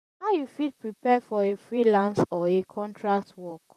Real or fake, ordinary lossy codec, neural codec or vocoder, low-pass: fake; none; vocoder, 44.1 kHz, 128 mel bands, Pupu-Vocoder; 14.4 kHz